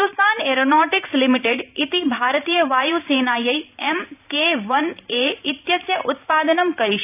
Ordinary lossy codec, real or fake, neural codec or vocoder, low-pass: AAC, 32 kbps; fake; vocoder, 44.1 kHz, 128 mel bands every 512 samples, BigVGAN v2; 3.6 kHz